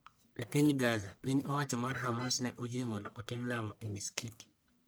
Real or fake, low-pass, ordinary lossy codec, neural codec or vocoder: fake; none; none; codec, 44.1 kHz, 1.7 kbps, Pupu-Codec